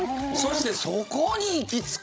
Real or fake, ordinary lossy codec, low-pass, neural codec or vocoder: fake; none; none; codec, 16 kHz, 16 kbps, FreqCodec, larger model